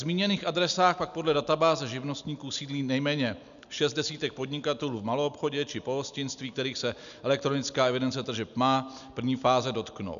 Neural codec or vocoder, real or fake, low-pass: none; real; 7.2 kHz